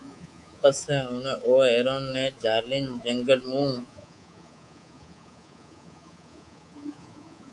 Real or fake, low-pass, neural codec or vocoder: fake; 10.8 kHz; codec, 24 kHz, 3.1 kbps, DualCodec